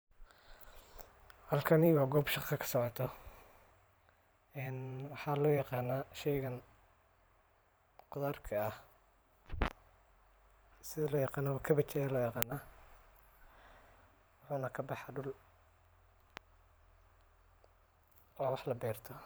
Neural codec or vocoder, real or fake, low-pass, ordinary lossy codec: vocoder, 44.1 kHz, 128 mel bands every 512 samples, BigVGAN v2; fake; none; none